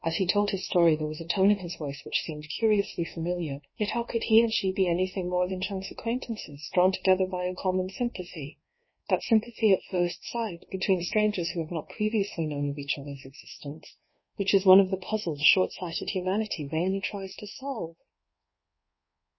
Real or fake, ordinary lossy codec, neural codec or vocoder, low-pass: fake; MP3, 24 kbps; codec, 24 kHz, 1.2 kbps, DualCodec; 7.2 kHz